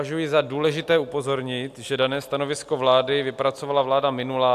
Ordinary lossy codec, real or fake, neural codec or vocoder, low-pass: AAC, 96 kbps; real; none; 14.4 kHz